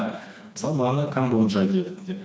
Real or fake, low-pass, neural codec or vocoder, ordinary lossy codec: fake; none; codec, 16 kHz, 2 kbps, FreqCodec, smaller model; none